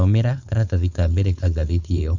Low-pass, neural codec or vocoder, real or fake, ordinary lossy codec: 7.2 kHz; codec, 16 kHz, 4.8 kbps, FACodec; fake; none